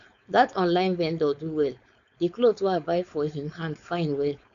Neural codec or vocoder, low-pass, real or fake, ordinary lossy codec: codec, 16 kHz, 4.8 kbps, FACodec; 7.2 kHz; fake; none